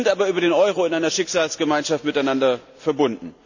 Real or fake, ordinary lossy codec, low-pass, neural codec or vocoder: real; none; 7.2 kHz; none